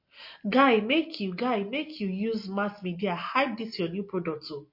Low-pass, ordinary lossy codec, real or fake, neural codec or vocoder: 5.4 kHz; MP3, 32 kbps; real; none